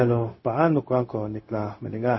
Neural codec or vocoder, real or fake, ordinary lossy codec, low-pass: codec, 16 kHz, 0.4 kbps, LongCat-Audio-Codec; fake; MP3, 24 kbps; 7.2 kHz